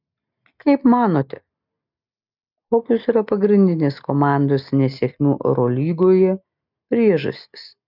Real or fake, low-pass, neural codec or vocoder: real; 5.4 kHz; none